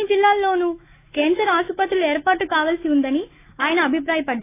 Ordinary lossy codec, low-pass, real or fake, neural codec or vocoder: AAC, 24 kbps; 3.6 kHz; fake; autoencoder, 48 kHz, 128 numbers a frame, DAC-VAE, trained on Japanese speech